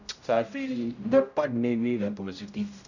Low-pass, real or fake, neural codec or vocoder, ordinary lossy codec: 7.2 kHz; fake; codec, 16 kHz, 0.5 kbps, X-Codec, HuBERT features, trained on general audio; none